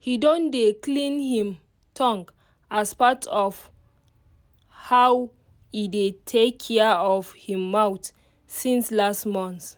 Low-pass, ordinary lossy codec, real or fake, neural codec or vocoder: none; none; real; none